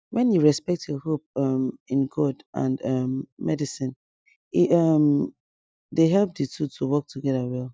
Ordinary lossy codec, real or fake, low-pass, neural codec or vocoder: none; real; none; none